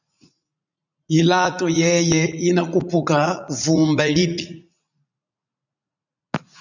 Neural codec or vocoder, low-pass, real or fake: vocoder, 44.1 kHz, 80 mel bands, Vocos; 7.2 kHz; fake